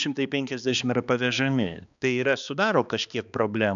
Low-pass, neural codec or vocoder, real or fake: 7.2 kHz; codec, 16 kHz, 2 kbps, X-Codec, HuBERT features, trained on balanced general audio; fake